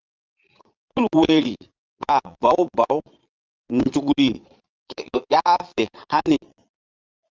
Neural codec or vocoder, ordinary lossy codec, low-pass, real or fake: vocoder, 22.05 kHz, 80 mel bands, Vocos; Opus, 32 kbps; 7.2 kHz; fake